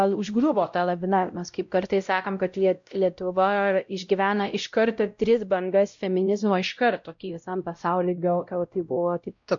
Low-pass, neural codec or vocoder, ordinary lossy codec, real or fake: 7.2 kHz; codec, 16 kHz, 0.5 kbps, X-Codec, WavLM features, trained on Multilingual LibriSpeech; MP3, 64 kbps; fake